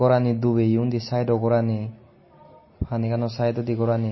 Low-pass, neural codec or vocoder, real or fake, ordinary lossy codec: 7.2 kHz; none; real; MP3, 24 kbps